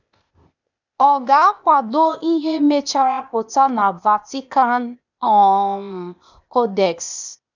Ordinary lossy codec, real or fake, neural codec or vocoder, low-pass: none; fake; codec, 16 kHz, 0.8 kbps, ZipCodec; 7.2 kHz